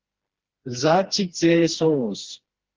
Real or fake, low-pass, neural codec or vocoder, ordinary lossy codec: fake; 7.2 kHz; codec, 16 kHz, 2 kbps, FreqCodec, smaller model; Opus, 16 kbps